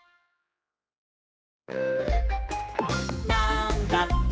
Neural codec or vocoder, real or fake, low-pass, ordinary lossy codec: codec, 16 kHz, 4 kbps, X-Codec, HuBERT features, trained on general audio; fake; none; none